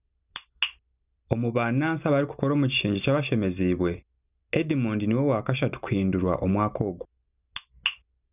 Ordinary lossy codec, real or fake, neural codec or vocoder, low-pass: none; real; none; 3.6 kHz